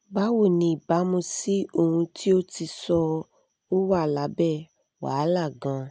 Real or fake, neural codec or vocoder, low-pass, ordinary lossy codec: real; none; none; none